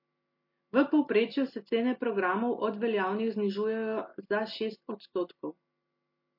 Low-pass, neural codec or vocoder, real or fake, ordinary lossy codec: 5.4 kHz; none; real; MP3, 32 kbps